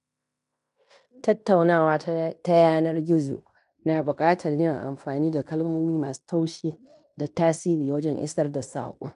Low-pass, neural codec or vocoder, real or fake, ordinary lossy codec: 10.8 kHz; codec, 16 kHz in and 24 kHz out, 0.9 kbps, LongCat-Audio-Codec, fine tuned four codebook decoder; fake; none